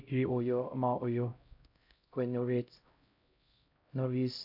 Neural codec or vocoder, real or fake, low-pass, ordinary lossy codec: codec, 16 kHz, 0.5 kbps, X-Codec, HuBERT features, trained on LibriSpeech; fake; 5.4 kHz; AAC, 48 kbps